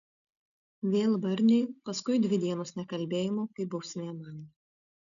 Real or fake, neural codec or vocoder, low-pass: real; none; 7.2 kHz